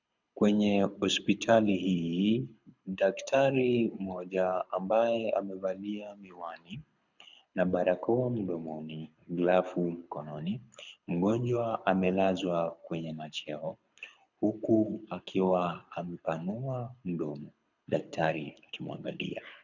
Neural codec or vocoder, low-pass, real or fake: codec, 24 kHz, 6 kbps, HILCodec; 7.2 kHz; fake